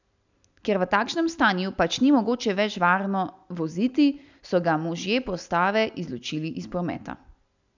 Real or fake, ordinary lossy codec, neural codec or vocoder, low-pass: real; none; none; 7.2 kHz